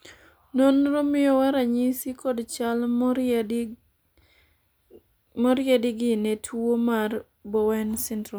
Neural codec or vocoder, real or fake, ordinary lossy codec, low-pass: none; real; none; none